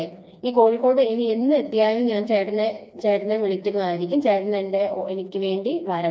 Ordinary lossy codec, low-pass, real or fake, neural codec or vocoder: none; none; fake; codec, 16 kHz, 2 kbps, FreqCodec, smaller model